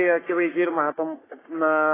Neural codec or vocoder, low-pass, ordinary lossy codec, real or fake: codec, 44.1 kHz, 3.4 kbps, Pupu-Codec; 3.6 kHz; AAC, 16 kbps; fake